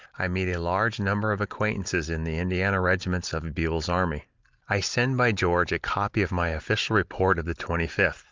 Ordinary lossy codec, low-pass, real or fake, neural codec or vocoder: Opus, 32 kbps; 7.2 kHz; real; none